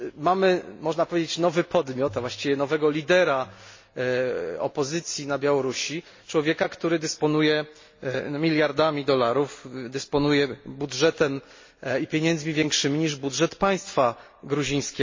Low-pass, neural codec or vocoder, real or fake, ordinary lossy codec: 7.2 kHz; none; real; MP3, 32 kbps